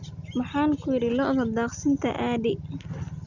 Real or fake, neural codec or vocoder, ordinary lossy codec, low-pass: real; none; none; 7.2 kHz